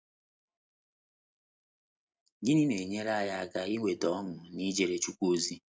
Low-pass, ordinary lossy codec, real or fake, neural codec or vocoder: none; none; real; none